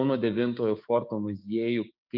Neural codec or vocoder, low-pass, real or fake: codec, 16 kHz, 6 kbps, DAC; 5.4 kHz; fake